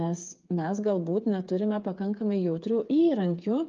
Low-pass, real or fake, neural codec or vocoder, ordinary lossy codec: 7.2 kHz; fake; codec, 16 kHz, 8 kbps, FreqCodec, smaller model; Opus, 32 kbps